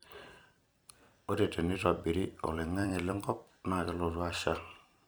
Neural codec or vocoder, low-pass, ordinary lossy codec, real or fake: vocoder, 44.1 kHz, 128 mel bands every 256 samples, BigVGAN v2; none; none; fake